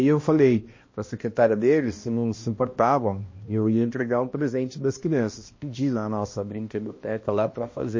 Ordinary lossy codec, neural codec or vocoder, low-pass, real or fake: MP3, 32 kbps; codec, 16 kHz, 1 kbps, X-Codec, HuBERT features, trained on balanced general audio; 7.2 kHz; fake